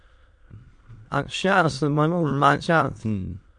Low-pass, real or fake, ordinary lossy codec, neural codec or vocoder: 9.9 kHz; fake; MP3, 64 kbps; autoencoder, 22.05 kHz, a latent of 192 numbers a frame, VITS, trained on many speakers